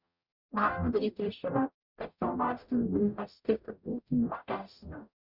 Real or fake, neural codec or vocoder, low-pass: fake; codec, 44.1 kHz, 0.9 kbps, DAC; 5.4 kHz